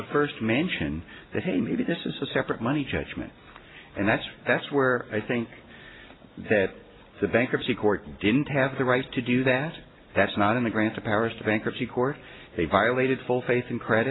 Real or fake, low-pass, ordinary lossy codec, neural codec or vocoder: real; 7.2 kHz; AAC, 16 kbps; none